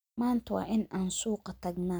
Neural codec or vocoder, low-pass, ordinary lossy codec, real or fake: none; none; none; real